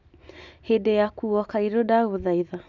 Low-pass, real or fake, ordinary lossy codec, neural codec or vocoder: 7.2 kHz; real; none; none